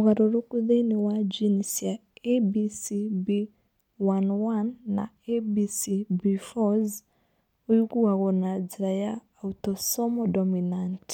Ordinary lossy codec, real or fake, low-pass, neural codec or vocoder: none; real; 19.8 kHz; none